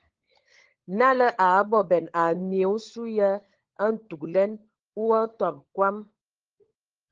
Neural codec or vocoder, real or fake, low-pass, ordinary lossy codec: codec, 16 kHz, 16 kbps, FunCodec, trained on LibriTTS, 50 frames a second; fake; 7.2 kHz; Opus, 16 kbps